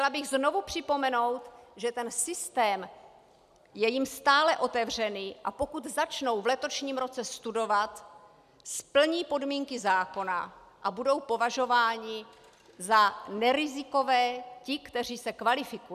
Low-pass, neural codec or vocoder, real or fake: 14.4 kHz; none; real